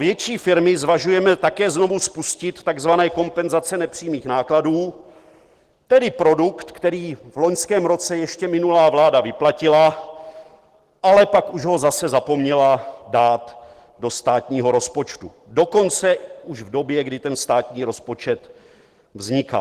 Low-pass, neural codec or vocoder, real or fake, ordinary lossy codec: 14.4 kHz; none; real; Opus, 16 kbps